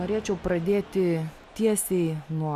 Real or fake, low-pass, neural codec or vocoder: real; 14.4 kHz; none